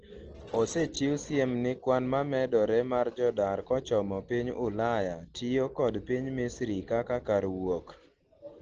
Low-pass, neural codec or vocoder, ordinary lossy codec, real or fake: 7.2 kHz; none; Opus, 16 kbps; real